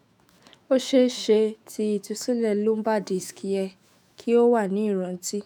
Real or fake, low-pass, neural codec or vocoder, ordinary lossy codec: fake; 19.8 kHz; autoencoder, 48 kHz, 128 numbers a frame, DAC-VAE, trained on Japanese speech; none